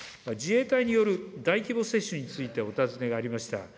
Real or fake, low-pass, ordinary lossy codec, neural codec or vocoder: real; none; none; none